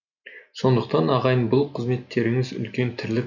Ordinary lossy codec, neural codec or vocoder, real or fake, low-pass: none; none; real; 7.2 kHz